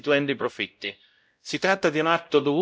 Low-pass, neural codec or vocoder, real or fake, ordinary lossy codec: none; codec, 16 kHz, 0.5 kbps, X-Codec, WavLM features, trained on Multilingual LibriSpeech; fake; none